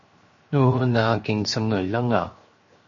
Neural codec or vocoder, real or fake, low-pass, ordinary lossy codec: codec, 16 kHz, 0.7 kbps, FocalCodec; fake; 7.2 kHz; MP3, 32 kbps